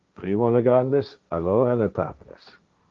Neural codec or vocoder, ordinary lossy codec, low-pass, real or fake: codec, 16 kHz, 1.1 kbps, Voila-Tokenizer; Opus, 32 kbps; 7.2 kHz; fake